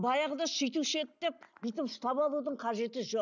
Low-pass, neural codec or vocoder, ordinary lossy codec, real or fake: 7.2 kHz; none; none; real